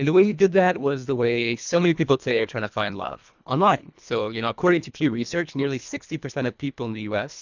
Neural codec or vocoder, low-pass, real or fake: codec, 24 kHz, 1.5 kbps, HILCodec; 7.2 kHz; fake